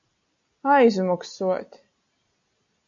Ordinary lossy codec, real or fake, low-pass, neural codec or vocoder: MP3, 64 kbps; real; 7.2 kHz; none